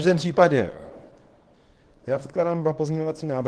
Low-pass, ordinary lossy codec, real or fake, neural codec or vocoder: 10.8 kHz; Opus, 16 kbps; fake; codec, 24 kHz, 0.9 kbps, WavTokenizer, small release